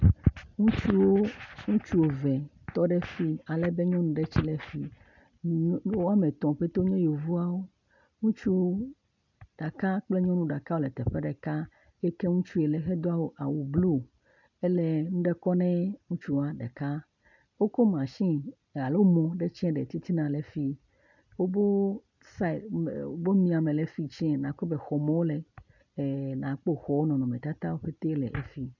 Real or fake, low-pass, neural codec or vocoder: real; 7.2 kHz; none